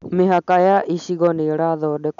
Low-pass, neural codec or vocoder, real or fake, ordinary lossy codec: 7.2 kHz; none; real; none